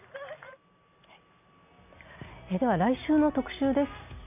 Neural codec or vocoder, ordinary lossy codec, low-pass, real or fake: none; none; 3.6 kHz; real